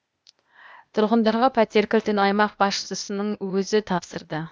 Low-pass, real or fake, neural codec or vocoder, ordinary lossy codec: none; fake; codec, 16 kHz, 0.8 kbps, ZipCodec; none